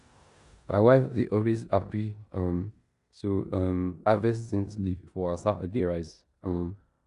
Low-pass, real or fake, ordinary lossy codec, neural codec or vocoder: 10.8 kHz; fake; none; codec, 16 kHz in and 24 kHz out, 0.9 kbps, LongCat-Audio-Codec, four codebook decoder